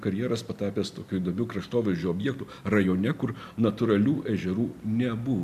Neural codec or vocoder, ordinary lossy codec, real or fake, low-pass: none; MP3, 96 kbps; real; 14.4 kHz